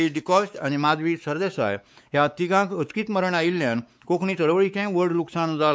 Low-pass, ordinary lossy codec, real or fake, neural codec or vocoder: none; none; fake; codec, 16 kHz, 4 kbps, X-Codec, WavLM features, trained on Multilingual LibriSpeech